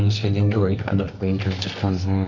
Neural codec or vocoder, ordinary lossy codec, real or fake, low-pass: codec, 24 kHz, 0.9 kbps, WavTokenizer, medium music audio release; none; fake; 7.2 kHz